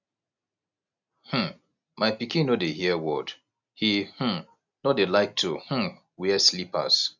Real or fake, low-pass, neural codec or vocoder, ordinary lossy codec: real; 7.2 kHz; none; none